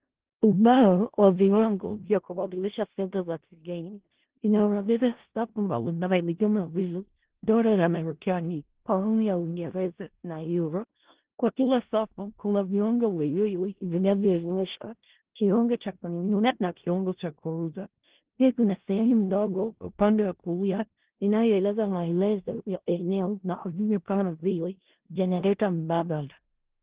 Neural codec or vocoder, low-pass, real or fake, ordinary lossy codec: codec, 16 kHz in and 24 kHz out, 0.4 kbps, LongCat-Audio-Codec, four codebook decoder; 3.6 kHz; fake; Opus, 16 kbps